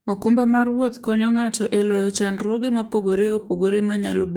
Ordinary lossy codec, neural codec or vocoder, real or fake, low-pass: none; codec, 44.1 kHz, 2.6 kbps, DAC; fake; none